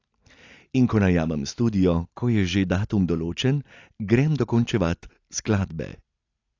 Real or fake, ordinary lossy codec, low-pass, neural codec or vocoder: real; AAC, 48 kbps; 7.2 kHz; none